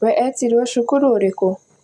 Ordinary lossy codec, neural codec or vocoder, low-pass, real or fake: none; none; none; real